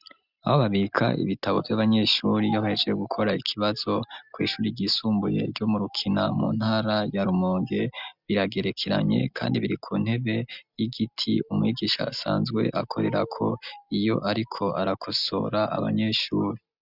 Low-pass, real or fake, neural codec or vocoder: 5.4 kHz; real; none